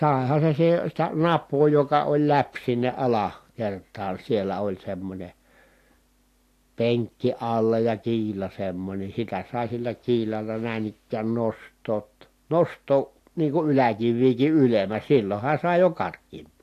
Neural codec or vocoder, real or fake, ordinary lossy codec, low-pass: none; real; AAC, 64 kbps; 14.4 kHz